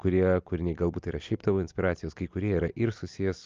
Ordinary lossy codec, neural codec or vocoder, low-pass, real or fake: Opus, 24 kbps; none; 7.2 kHz; real